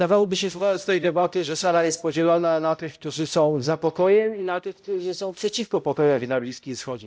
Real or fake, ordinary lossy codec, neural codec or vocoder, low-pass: fake; none; codec, 16 kHz, 0.5 kbps, X-Codec, HuBERT features, trained on balanced general audio; none